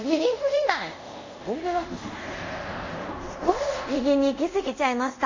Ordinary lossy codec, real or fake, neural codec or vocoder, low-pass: MP3, 32 kbps; fake; codec, 24 kHz, 0.5 kbps, DualCodec; 7.2 kHz